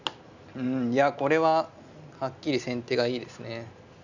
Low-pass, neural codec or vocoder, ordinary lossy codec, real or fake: 7.2 kHz; none; none; real